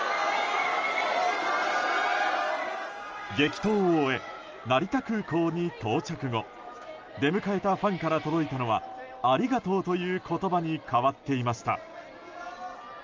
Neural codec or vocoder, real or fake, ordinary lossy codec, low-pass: none; real; Opus, 24 kbps; 7.2 kHz